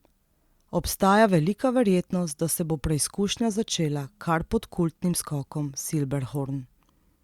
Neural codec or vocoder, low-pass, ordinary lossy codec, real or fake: none; 19.8 kHz; Opus, 64 kbps; real